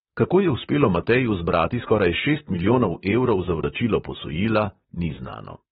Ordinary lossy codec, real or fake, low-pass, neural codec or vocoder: AAC, 16 kbps; fake; 19.8 kHz; vocoder, 44.1 kHz, 128 mel bands, Pupu-Vocoder